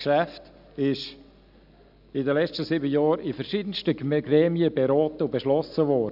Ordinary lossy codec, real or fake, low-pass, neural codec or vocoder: none; real; 5.4 kHz; none